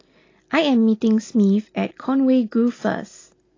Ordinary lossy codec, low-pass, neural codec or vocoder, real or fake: AAC, 32 kbps; 7.2 kHz; none; real